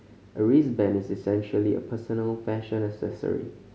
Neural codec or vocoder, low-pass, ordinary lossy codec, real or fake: none; none; none; real